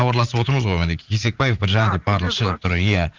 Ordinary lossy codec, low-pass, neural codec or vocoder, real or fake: Opus, 32 kbps; 7.2 kHz; vocoder, 44.1 kHz, 80 mel bands, Vocos; fake